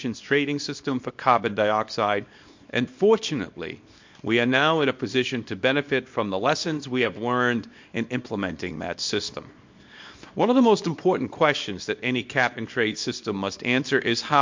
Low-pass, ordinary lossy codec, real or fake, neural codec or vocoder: 7.2 kHz; MP3, 48 kbps; fake; codec, 24 kHz, 0.9 kbps, WavTokenizer, small release